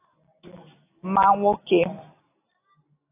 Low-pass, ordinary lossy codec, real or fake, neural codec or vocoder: 3.6 kHz; AAC, 16 kbps; real; none